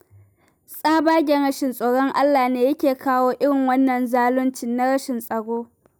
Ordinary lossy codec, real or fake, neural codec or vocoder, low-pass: none; real; none; none